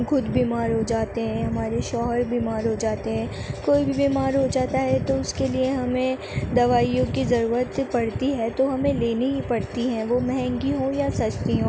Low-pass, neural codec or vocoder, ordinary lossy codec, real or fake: none; none; none; real